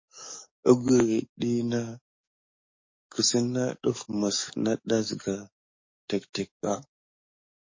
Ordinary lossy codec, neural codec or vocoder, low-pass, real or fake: MP3, 32 kbps; codec, 44.1 kHz, 7.8 kbps, DAC; 7.2 kHz; fake